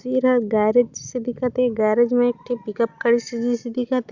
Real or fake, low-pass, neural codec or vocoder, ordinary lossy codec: real; 7.2 kHz; none; Opus, 64 kbps